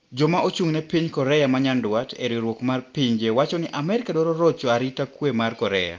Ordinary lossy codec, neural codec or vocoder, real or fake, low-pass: Opus, 32 kbps; none; real; 7.2 kHz